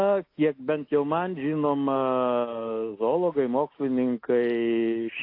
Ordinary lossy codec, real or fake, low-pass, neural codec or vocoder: AAC, 32 kbps; real; 5.4 kHz; none